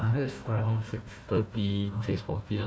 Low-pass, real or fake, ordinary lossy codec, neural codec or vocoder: none; fake; none; codec, 16 kHz, 1 kbps, FunCodec, trained on Chinese and English, 50 frames a second